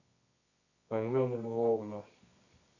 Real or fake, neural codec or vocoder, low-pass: fake; codec, 24 kHz, 0.9 kbps, WavTokenizer, medium music audio release; 7.2 kHz